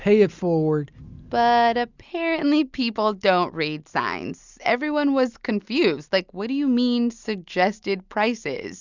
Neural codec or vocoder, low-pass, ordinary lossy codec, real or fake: none; 7.2 kHz; Opus, 64 kbps; real